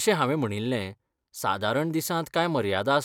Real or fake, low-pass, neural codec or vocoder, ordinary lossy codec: real; 19.8 kHz; none; none